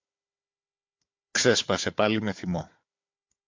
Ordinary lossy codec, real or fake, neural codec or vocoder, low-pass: MP3, 48 kbps; fake; codec, 16 kHz, 4 kbps, FunCodec, trained on Chinese and English, 50 frames a second; 7.2 kHz